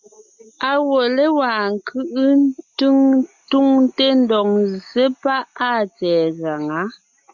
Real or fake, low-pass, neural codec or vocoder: real; 7.2 kHz; none